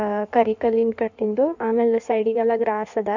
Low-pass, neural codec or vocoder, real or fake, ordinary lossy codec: 7.2 kHz; codec, 16 kHz in and 24 kHz out, 1.1 kbps, FireRedTTS-2 codec; fake; none